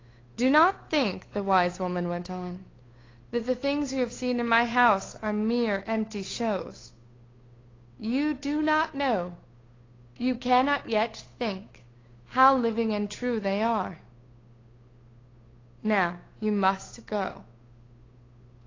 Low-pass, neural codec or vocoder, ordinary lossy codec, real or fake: 7.2 kHz; codec, 16 kHz, 8 kbps, FunCodec, trained on Chinese and English, 25 frames a second; AAC, 32 kbps; fake